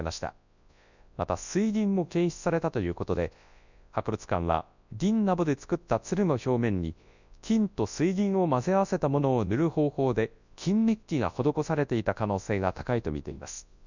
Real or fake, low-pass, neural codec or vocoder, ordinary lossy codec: fake; 7.2 kHz; codec, 24 kHz, 0.9 kbps, WavTokenizer, large speech release; none